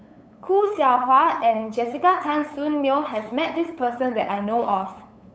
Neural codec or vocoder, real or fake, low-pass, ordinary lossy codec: codec, 16 kHz, 8 kbps, FunCodec, trained on LibriTTS, 25 frames a second; fake; none; none